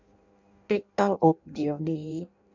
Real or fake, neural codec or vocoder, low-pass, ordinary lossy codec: fake; codec, 16 kHz in and 24 kHz out, 0.6 kbps, FireRedTTS-2 codec; 7.2 kHz; none